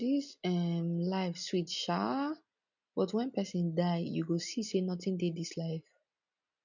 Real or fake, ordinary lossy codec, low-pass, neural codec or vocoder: real; none; 7.2 kHz; none